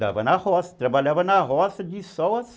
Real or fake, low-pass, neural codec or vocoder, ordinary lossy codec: real; none; none; none